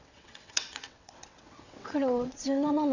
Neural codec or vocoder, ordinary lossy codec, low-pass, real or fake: vocoder, 22.05 kHz, 80 mel bands, WaveNeXt; none; 7.2 kHz; fake